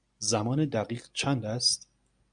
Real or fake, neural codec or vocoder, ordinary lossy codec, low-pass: real; none; MP3, 96 kbps; 9.9 kHz